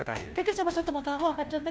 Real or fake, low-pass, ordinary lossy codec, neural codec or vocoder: fake; none; none; codec, 16 kHz, 1 kbps, FunCodec, trained on LibriTTS, 50 frames a second